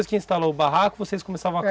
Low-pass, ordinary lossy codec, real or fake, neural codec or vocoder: none; none; real; none